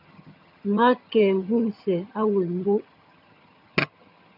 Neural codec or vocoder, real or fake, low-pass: vocoder, 22.05 kHz, 80 mel bands, HiFi-GAN; fake; 5.4 kHz